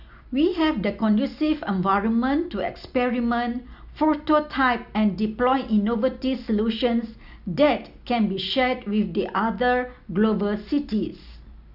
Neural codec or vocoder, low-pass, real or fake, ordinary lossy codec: none; 5.4 kHz; real; none